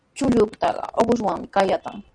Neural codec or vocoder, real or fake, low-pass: none; real; 9.9 kHz